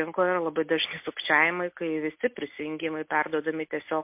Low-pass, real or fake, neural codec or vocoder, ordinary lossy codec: 3.6 kHz; real; none; MP3, 32 kbps